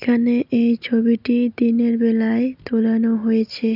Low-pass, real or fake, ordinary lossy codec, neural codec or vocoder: 5.4 kHz; real; none; none